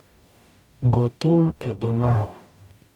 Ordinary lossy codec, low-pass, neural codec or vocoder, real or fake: none; 19.8 kHz; codec, 44.1 kHz, 0.9 kbps, DAC; fake